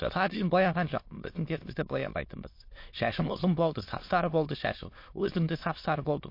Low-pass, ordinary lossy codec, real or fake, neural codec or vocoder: 5.4 kHz; MP3, 32 kbps; fake; autoencoder, 22.05 kHz, a latent of 192 numbers a frame, VITS, trained on many speakers